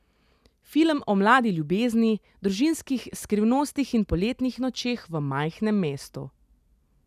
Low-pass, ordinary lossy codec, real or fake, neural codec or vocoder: 14.4 kHz; none; real; none